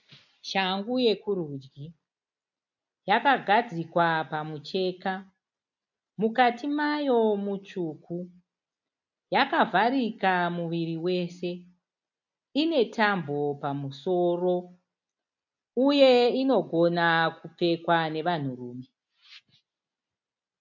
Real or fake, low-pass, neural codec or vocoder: real; 7.2 kHz; none